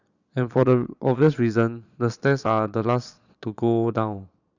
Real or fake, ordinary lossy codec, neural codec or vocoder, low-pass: fake; none; vocoder, 22.05 kHz, 80 mel bands, Vocos; 7.2 kHz